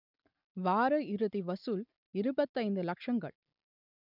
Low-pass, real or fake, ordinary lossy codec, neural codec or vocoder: 5.4 kHz; real; none; none